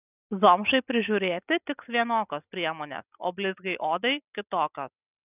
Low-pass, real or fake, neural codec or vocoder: 3.6 kHz; real; none